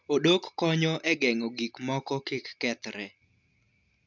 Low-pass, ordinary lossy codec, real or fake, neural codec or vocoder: 7.2 kHz; none; real; none